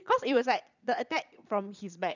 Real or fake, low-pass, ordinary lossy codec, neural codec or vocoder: fake; 7.2 kHz; none; vocoder, 22.05 kHz, 80 mel bands, Vocos